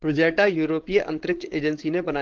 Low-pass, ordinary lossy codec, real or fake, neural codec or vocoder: 7.2 kHz; Opus, 16 kbps; fake; codec, 16 kHz, 4.8 kbps, FACodec